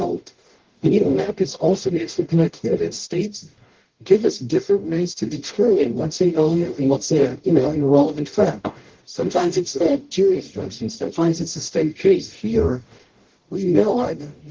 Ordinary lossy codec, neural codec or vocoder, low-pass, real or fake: Opus, 16 kbps; codec, 44.1 kHz, 0.9 kbps, DAC; 7.2 kHz; fake